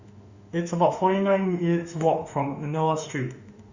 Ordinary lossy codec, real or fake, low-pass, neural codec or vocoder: Opus, 64 kbps; fake; 7.2 kHz; autoencoder, 48 kHz, 32 numbers a frame, DAC-VAE, trained on Japanese speech